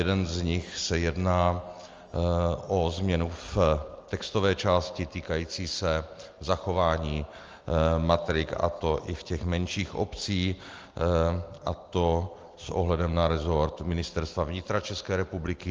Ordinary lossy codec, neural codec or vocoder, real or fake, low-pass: Opus, 32 kbps; none; real; 7.2 kHz